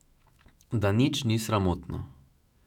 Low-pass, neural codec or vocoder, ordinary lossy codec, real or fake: 19.8 kHz; autoencoder, 48 kHz, 128 numbers a frame, DAC-VAE, trained on Japanese speech; none; fake